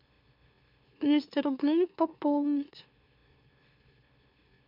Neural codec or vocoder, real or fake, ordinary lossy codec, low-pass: autoencoder, 44.1 kHz, a latent of 192 numbers a frame, MeloTTS; fake; MP3, 48 kbps; 5.4 kHz